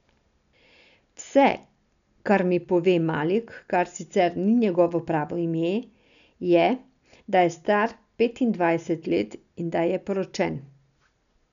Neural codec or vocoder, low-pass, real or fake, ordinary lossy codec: none; 7.2 kHz; real; none